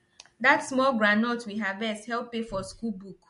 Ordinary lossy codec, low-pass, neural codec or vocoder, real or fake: MP3, 48 kbps; 10.8 kHz; none; real